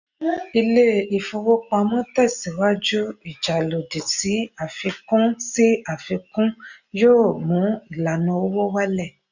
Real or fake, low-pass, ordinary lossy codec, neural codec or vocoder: real; 7.2 kHz; none; none